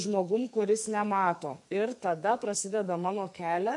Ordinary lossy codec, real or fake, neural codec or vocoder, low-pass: MP3, 64 kbps; fake; codec, 44.1 kHz, 2.6 kbps, SNAC; 10.8 kHz